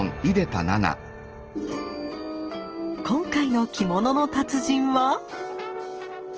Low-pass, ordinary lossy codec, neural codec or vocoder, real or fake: 7.2 kHz; Opus, 16 kbps; none; real